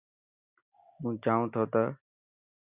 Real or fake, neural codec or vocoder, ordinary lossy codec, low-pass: real; none; Opus, 64 kbps; 3.6 kHz